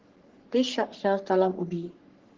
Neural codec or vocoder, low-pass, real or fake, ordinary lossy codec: codec, 44.1 kHz, 3.4 kbps, Pupu-Codec; 7.2 kHz; fake; Opus, 16 kbps